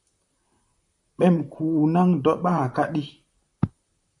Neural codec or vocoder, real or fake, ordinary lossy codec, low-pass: vocoder, 44.1 kHz, 128 mel bands, Pupu-Vocoder; fake; MP3, 48 kbps; 10.8 kHz